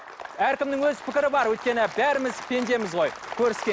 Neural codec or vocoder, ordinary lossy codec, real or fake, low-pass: none; none; real; none